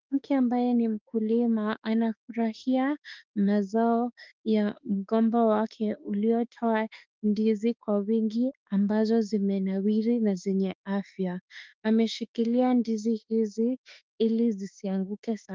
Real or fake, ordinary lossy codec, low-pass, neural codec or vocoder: fake; Opus, 24 kbps; 7.2 kHz; autoencoder, 48 kHz, 32 numbers a frame, DAC-VAE, trained on Japanese speech